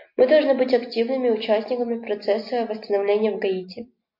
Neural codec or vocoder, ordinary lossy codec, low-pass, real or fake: none; MP3, 32 kbps; 5.4 kHz; real